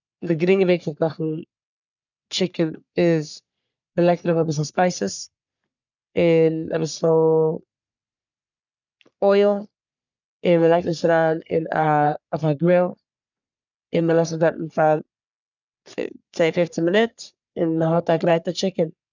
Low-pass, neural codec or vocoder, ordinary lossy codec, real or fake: 7.2 kHz; codec, 44.1 kHz, 3.4 kbps, Pupu-Codec; none; fake